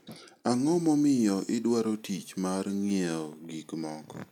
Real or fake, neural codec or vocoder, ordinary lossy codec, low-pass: real; none; none; 19.8 kHz